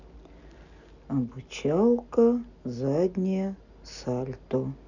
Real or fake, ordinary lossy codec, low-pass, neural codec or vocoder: real; none; 7.2 kHz; none